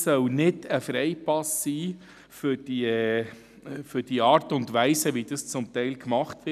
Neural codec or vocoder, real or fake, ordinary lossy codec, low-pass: none; real; none; 14.4 kHz